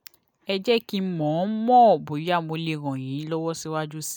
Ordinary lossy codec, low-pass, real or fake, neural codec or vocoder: none; none; real; none